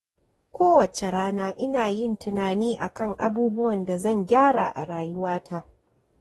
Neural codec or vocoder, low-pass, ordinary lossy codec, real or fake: codec, 44.1 kHz, 2.6 kbps, DAC; 19.8 kHz; AAC, 32 kbps; fake